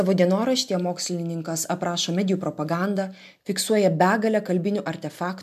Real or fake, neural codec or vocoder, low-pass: real; none; 14.4 kHz